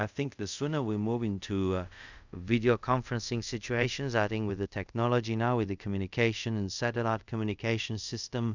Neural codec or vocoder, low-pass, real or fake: codec, 24 kHz, 0.5 kbps, DualCodec; 7.2 kHz; fake